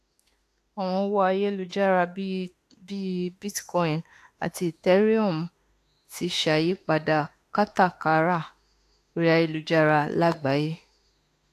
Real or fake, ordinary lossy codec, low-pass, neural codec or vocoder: fake; AAC, 64 kbps; 14.4 kHz; autoencoder, 48 kHz, 32 numbers a frame, DAC-VAE, trained on Japanese speech